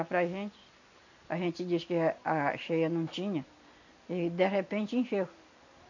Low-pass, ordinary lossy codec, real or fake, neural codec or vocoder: 7.2 kHz; none; real; none